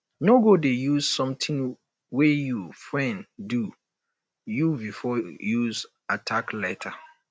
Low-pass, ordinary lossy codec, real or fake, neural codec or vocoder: none; none; real; none